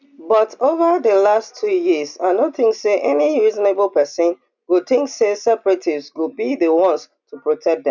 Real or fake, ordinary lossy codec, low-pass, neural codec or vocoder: real; none; 7.2 kHz; none